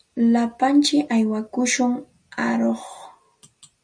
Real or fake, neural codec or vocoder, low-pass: real; none; 9.9 kHz